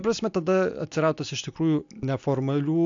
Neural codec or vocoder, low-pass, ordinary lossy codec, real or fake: none; 7.2 kHz; AAC, 64 kbps; real